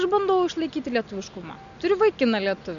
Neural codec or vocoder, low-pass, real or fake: none; 7.2 kHz; real